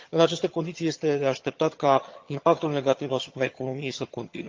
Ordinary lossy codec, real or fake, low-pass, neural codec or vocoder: Opus, 32 kbps; fake; 7.2 kHz; vocoder, 22.05 kHz, 80 mel bands, HiFi-GAN